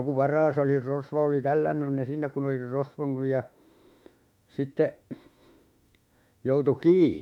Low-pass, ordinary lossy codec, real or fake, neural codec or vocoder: 19.8 kHz; MP3, 96 kbps; fake; autoencoder, 48 kHz, 32 numbers a frame, DAC-VAE, trained on Japanese speech